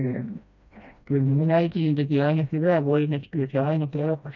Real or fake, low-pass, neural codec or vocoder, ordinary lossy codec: fake; 7.2 kHz; codec, 16 kHz, 1 kbps, FreqCodec, smaller model; none